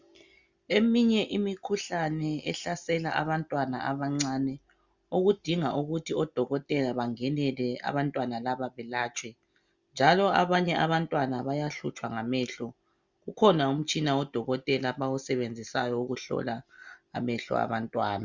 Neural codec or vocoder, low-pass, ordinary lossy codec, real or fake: none; 7.2 kHz; Opus, 64 kbps; real